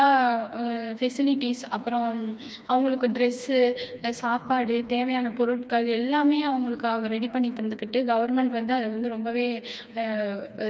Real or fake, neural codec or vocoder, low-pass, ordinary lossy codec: fake; codec, 16 kHz, 2 kbps, FreqCodec, smaller model; none; none